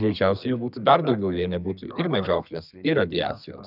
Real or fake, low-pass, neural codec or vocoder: fake; 5.4 kHz; codec, 32 kHz, 1.9 kbps, SNAC